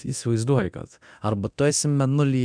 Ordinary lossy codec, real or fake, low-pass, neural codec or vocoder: MP3, 96 kbps; fake; 9.9 kHz; codec, 24 kHz, 0.9 kbps, DualCodec